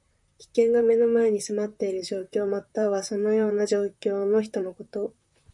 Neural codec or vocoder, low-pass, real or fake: vocoder, 44.1 kHz, 128 mel bands, Pupu-Vocoder; 10.8 kHz; fake